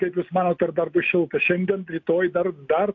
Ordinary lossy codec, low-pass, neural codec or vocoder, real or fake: AAC, 48 kbps; 7.2 kHz; none; real